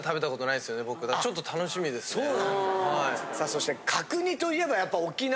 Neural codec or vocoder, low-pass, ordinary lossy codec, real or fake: none; none; none; real